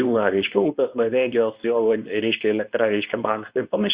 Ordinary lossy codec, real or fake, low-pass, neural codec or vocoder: Opus, 64 kbps; fake; 3.6 kHz; codec, 24 kHz, 0.9 kbps, WavTokenizer, medium speech release version 2